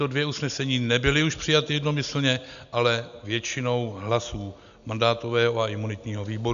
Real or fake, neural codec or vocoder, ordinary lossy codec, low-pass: real; none; AAC, 96 kbps; 7.2 kHz